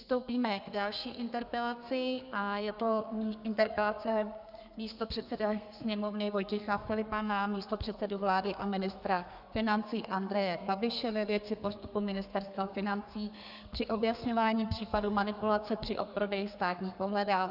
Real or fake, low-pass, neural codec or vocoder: fake; 5.4 kHz; codec, 32 kHz, 1.9 kbps, SNAC